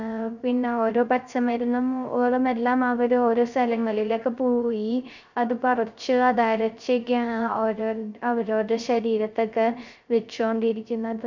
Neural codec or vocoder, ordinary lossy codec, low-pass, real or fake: codec, 16 kHz, 0.3 kbps, FocalCodec; none; 7.2 kHz; fake